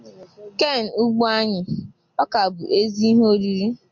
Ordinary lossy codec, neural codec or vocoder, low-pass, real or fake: MP3, 64 kbps; none; 7.2 kHz; real